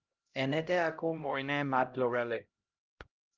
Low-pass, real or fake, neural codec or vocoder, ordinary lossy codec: 7.2 kHz; fake; codec, 16 kHz, 0.5 kbps, X-Codec, HuBERT features, trained on LibriSpeech; Opus, 32 kbps